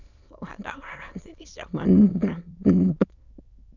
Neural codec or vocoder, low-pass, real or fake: autoencoder, 22.05 kHz, a latent of 192 numbers a frame, VITS, trained on many speakers; 7.2 kHz; fake